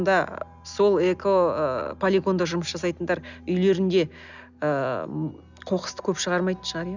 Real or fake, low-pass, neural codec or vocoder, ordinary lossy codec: real; 7.2 kHz; none; none